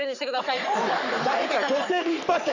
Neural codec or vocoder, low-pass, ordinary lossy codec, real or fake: codec, 44.1 kHz, 3.4 kbps, Pupu-Codec; 7.2 kHz; none; fake